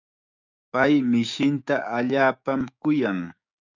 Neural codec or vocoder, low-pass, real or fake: codec, 16 kHz, 6 kbps, DAC; 7.2 kHz; fake